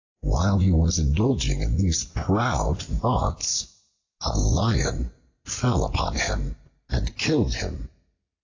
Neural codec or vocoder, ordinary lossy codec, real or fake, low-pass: none; AAC, 48 kbps; real; 7.2 kHz